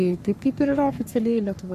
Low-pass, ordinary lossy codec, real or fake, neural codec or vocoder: 14.4 kHz; AAC, 96 kbps; fake; codec, 44.1 kHz, 3.4 kbps, Pupu-Codec